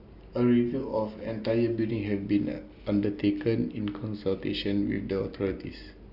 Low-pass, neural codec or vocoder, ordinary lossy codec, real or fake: 5.4 kHz; none; Opus, 64 kbps; real